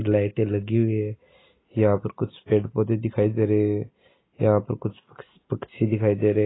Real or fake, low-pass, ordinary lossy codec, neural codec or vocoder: real; 7.2 kHz; AAC, 16 kbps; none